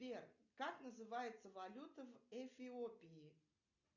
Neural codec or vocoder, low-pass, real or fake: none; 7.2 kHz; real